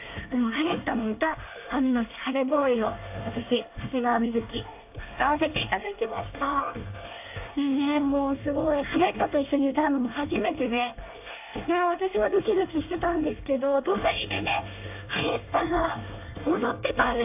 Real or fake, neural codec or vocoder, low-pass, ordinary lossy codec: fake; codec, 24 kHz, 1 kbps, SNAC; 3.6 kHz; AAC, 32 kbps